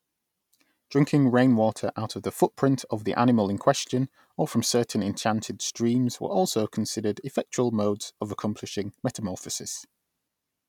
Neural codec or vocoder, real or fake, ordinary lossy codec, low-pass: none; real; none; 19.8 kHz